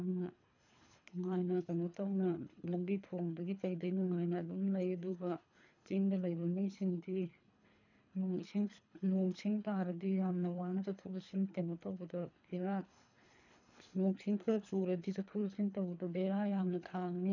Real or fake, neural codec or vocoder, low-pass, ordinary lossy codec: fake; codec, 24 kHz, 3 kbps, HILCodec; 7.2 kHz; none